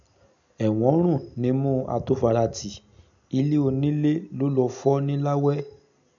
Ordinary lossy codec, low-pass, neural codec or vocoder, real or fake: none; 7.2 kHz; none; real